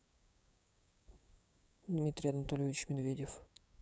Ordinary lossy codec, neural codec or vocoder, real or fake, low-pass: none; codec, 16 kHz, 6 kbps, DAC; fake; none